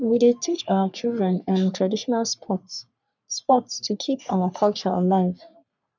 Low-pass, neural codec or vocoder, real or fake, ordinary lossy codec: 7.2 kHz; codec, 44.1 kHz, 3.4 kbps, Pupu-Codec; fake; none